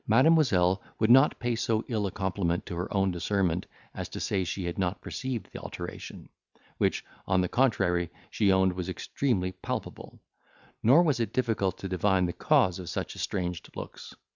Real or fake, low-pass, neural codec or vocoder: real; 7.2 kHz; none